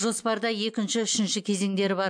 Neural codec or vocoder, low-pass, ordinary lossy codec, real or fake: none; 9.9 kHz; MP3, 64 kbps; real